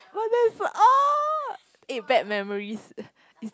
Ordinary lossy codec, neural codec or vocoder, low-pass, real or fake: none; none; none; real